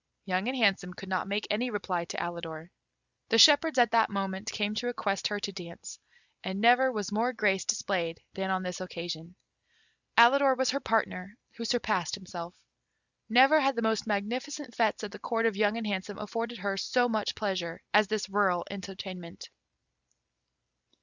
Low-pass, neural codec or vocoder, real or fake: 7.2 kHz; none; real